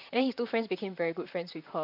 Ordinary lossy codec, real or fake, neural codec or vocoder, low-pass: none; fake; vocoder, 44.1 kHz, 128 mel bands, Pupu-Vocoder; 5.4 kHz